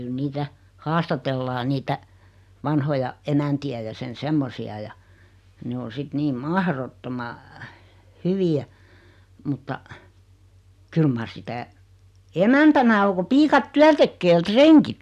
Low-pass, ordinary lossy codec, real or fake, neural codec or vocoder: 14.4 kHz; none; real; none